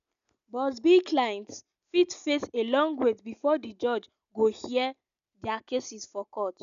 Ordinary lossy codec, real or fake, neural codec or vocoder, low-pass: none; real; none; 7.2 kHz